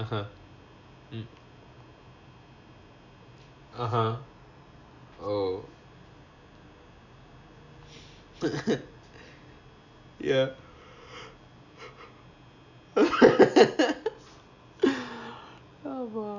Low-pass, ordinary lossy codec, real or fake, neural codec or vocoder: 7.2 kHz; none; real; none